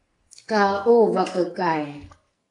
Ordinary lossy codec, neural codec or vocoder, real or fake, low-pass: AAC, 48 kbps; codec, 44.1 kHz, 2.6 kbps, SNAC; fake; 10.8 kHz